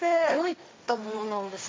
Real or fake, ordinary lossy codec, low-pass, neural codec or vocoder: fake; none; none; codec, 16 kHz, 1.1 kbps, Voila-Tokenizer